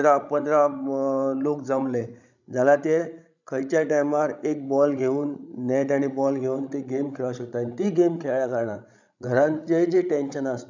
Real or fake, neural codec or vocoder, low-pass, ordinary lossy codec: fake; codec, 16 kHz, 16 kbps, FreqCodec, larger model; 7.2 kHz; none